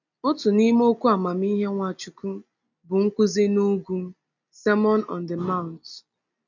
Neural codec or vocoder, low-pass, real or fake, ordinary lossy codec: none; 7.2 kHz; real; none